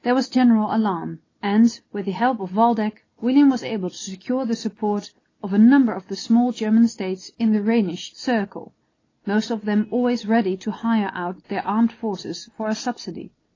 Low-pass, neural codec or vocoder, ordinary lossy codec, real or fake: 7.2 kHz; none; AAC, 32 kbps; real